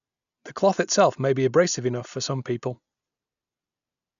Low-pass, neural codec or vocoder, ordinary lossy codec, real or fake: 7.2 kHz; none; none; real